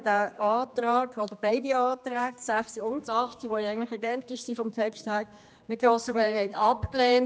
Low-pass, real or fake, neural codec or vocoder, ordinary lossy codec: none; fake; codec, 16 kHz, 2 kbps, X-Codec, HuBERT features, trained on general audio; none